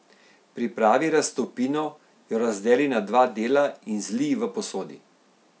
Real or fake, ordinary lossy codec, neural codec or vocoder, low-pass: real; none; none; none